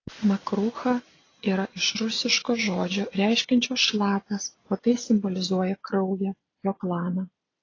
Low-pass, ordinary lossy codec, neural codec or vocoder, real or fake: 7.2 kHz; AAC, 32 kbps; none; real